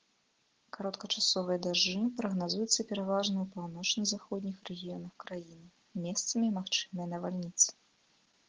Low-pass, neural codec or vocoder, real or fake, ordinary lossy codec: 7.2 kHz; none; real; Opus, 16 kbps